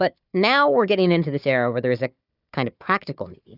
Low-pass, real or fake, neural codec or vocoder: 5.4 kHz; fake; codec, 16 kHz, 4 kbps, FunCodec, trained on Chinese and English, 50 frames a second